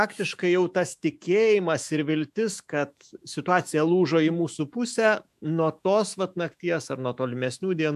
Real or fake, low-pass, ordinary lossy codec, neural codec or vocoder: fake; 14.4 kHz; MP3, 96 kbps; autoencoder, 48 kHz, 128 numbers a frame, DAC-VAE, trained on Japanese speech